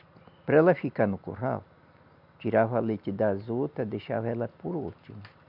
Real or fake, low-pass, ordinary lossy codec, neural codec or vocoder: real; 5.4 kHz; none; none